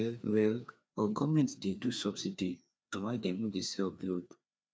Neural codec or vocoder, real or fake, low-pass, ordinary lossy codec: codec, 16 kHz, 2 kbps, FreqCodec, larger model; fake; none; none